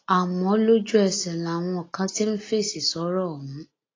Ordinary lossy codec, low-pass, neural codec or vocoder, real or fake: AAC, 32 kbps; 7.2 kHz; none; real